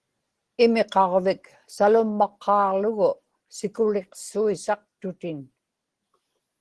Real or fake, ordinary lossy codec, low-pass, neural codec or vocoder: real; Opus, 16 kbps; 10.8 kHz; none